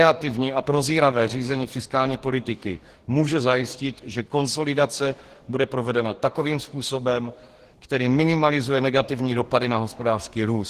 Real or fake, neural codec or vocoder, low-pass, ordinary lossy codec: fake; codec, 44.1 kHz, 2.6 kbps, DAC; 14.4 kHz; Opus, 16 kbps